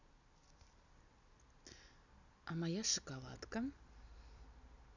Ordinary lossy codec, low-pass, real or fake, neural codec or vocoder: none; 7.2 kHz; real; none